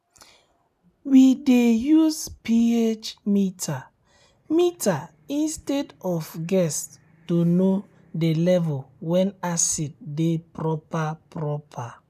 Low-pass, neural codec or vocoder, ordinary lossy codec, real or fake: 14.4 kHz; none; none; real